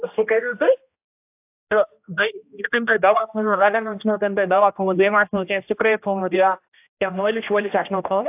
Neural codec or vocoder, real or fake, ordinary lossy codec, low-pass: codec, 16 kHz, 1 kbps, X-Codec, HuBERT features, trained on general audio; fake; AAC, 32 kbps; 3.6 kHz